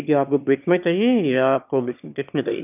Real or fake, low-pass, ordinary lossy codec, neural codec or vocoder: fake; 3.6 kHz; none; autoencoder, 22.05 kHz, a latent of 192 numbers a frame, VITS, trained on one speaker